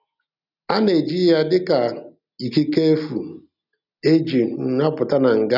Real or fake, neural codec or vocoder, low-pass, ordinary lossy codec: real; none; 5.4 kHz; none